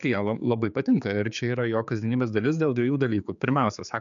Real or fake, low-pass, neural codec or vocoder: fake; 7.2 kHz; codec, 16 kHz, 4 kbps, X-Codec, HuBERT features, trained on general audio